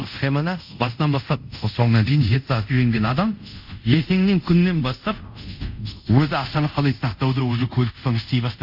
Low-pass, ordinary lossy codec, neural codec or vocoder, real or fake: 5.4 kHz; none; codec, 24 kHz, 0.5 kbps, DualCodec; fake